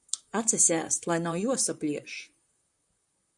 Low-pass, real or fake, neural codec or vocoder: 10.8 kHz; fake; vocoder, 44.1 kHz, 128 mel bands, Pupu-Vocoder